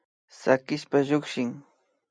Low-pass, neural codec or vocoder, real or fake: 9.9 kHz; none; real